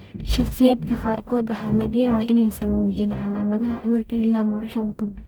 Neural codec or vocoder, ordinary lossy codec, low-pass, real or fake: codec, 44.1 kHz, 0.9 kbps, DAC; none; 19.8 kHz; fake